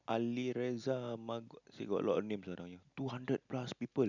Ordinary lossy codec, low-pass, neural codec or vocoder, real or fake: none; 7.2 kHz; none; real